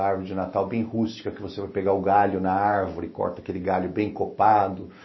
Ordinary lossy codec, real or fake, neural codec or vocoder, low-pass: MP3, 24 kbps; real; none; 7.2 kHz